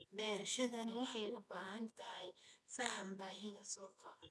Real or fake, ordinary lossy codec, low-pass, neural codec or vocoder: fake; none; none; codec, 24 kHz, 0.9 kbps, WavTokenizer, medium music audio release